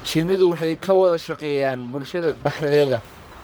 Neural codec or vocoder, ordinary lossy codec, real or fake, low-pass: codec, 44.1 kHz, 1.7 kbps, Pupu-Codec; none; fake; none